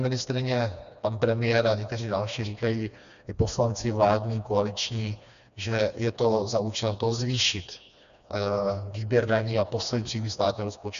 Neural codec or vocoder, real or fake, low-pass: codec, 16 kHz, 2 kbps, FreqCodec, smaller model; fake; 7.2 kHz